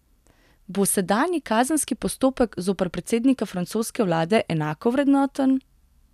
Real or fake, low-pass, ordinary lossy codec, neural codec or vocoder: real; 14.4 kHz; none; none